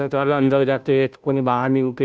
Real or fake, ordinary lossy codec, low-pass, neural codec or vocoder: fake; none; none; codec, 16 kHz, 0.5 kbps, FunCodec, trained on Chinese and English, 25 frames a second